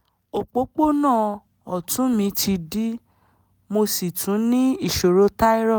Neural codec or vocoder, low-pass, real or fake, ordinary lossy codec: none; none; real; none